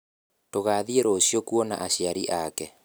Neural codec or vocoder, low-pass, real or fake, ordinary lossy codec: none; none; real; none